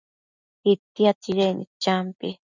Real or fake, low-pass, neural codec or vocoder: real; 7.2 kHz; none